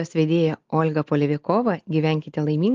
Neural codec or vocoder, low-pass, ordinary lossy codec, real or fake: none; 7.2 kHz; Opus, 32 kbps; real